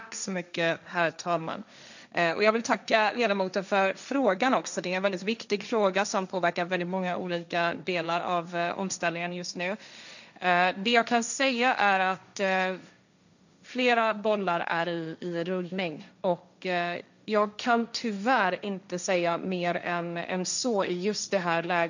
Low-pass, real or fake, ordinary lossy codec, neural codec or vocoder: 7.2 kHz; fake; none; codec, 16 kHz, 1.1 kbps, Voila-Tokenizer